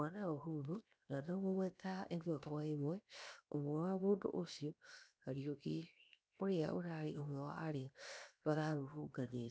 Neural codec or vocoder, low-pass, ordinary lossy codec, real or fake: codec, 16 kHz, 0.7 kbps, FocalCodec; none; none; fake